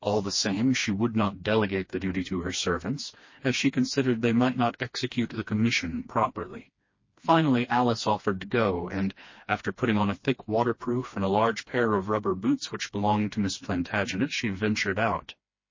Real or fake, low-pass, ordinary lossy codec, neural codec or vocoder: fake; 7.2 kHz; MP3, 32 kbps; codec, 16 kHz, 2 kbps, FreqCodec, smaller model